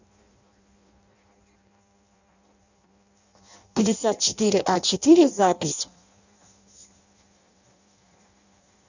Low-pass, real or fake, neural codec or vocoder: 7.2 kHz; fake; codec, 16 kHz in and 24 kHz out, 0.6 kbps, FireRedTTS-2 codec